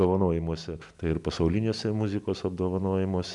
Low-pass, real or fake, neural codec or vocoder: 10.8 kHz; real; none